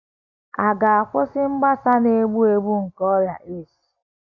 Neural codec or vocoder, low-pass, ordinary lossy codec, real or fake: none; 7.2 kHz; AAC, 48 kbps; real